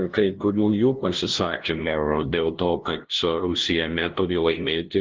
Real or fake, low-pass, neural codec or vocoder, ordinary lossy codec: fake; 7.2 kHz; codec, 16 kHz, 0.5 kbps, FunCodec, trained on LibriTTS, 25 frames a second; Opus, 16 kbps